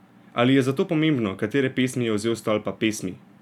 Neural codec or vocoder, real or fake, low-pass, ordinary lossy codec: none; real; 19.8 kHz; none